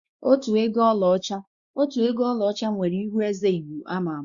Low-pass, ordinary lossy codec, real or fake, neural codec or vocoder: 7.2 kHz; Opus, 64 kbps; fake; codec, 16 kHz, 2 kbps, X-Codec, WavLM features, trained on Multilingual LibriSpeech